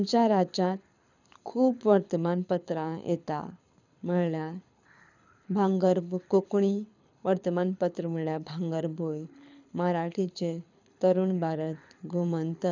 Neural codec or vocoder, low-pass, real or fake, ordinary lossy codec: codec, 24 kHz, 6 kbps, HILCodec; 7.2 kHz; fake; none